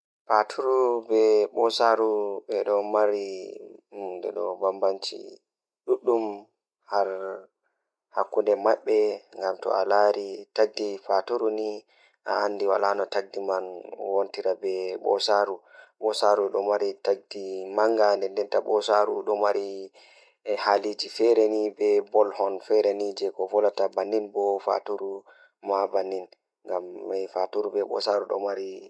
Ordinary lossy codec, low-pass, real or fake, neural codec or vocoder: none; none; real; none